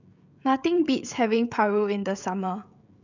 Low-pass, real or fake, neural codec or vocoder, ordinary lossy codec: 7.2 kHz; fake; codec, 16 kHz, 16 kbps, FreqCodec, smaller model; none